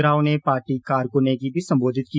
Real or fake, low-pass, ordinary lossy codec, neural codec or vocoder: real; none; none; none